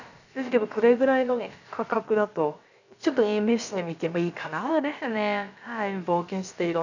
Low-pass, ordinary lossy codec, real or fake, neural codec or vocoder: 7.2 kHz; none; fake; codec, 16 kHz, about 1 kbps, DyCAST, with the encoder's durations